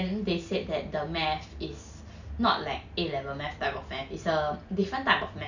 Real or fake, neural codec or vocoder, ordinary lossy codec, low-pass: real; none; none; 7.2 kHz